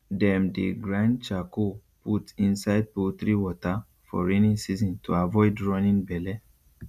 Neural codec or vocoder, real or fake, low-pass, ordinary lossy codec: none; real; 14.4 kHz; none